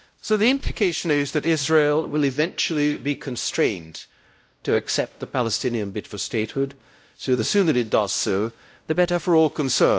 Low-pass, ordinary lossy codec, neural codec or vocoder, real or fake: none; none; codec, 16 kHz, 0.5 kbps, X-Codec, WavLM features, trained on Multilingual LibriSpeech; fake